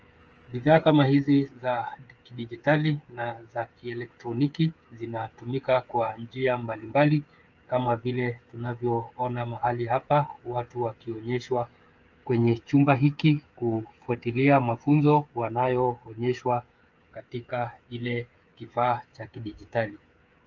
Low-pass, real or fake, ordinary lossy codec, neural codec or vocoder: 7.2 kHz; fake; Opus, 24 kbps; codec, 16 kHz, 8 kbps, FreqCodec, smaller model